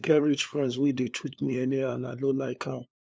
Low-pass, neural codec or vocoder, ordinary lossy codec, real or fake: none; codec, 16 kHz, 2 kbps, FunCodec, trained on LibriTTS, 25 frames a second; none; fake